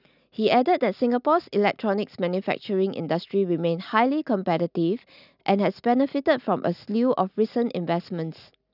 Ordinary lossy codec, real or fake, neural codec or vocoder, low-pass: none; real; none; 5.4 kHz